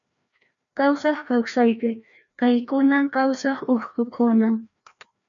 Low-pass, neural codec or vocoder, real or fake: 7.2 kHz; codec, 16 kHz, 1 kbps, FreqCodec, larger model; fake